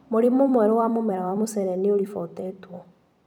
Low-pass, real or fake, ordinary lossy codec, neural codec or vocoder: 19.8 kHz; fake; none; vocoder, 44.1 kHz, 128 mel bands every 256 samples, BigVGAN v2